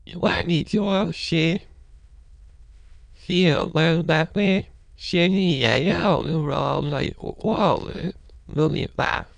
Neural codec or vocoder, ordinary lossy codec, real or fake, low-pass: autoencoder, 22.05 kHz, a latent of 192 numbers a frame, VITS, trained on many speakers; none; fake; 9.9 kHz